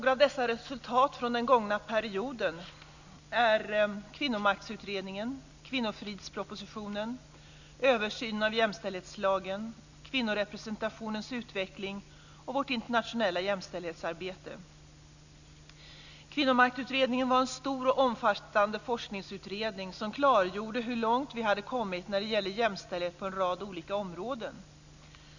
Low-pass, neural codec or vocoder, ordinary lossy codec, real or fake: 7.2 kHz; none; none; real